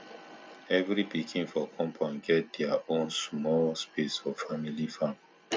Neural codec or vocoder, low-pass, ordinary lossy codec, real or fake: none; none; none; real